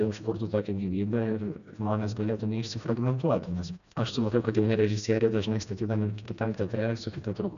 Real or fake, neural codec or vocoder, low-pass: fake; codec, 16 kHz, 1 kbps, FreqCodec, smaller model; 7.2 kHz